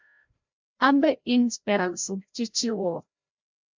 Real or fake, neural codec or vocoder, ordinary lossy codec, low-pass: fake; codec, 16 kHz, 0.5 kbps, FreqCodec, larger model; MP3, 64 kbps; 7.2 kHz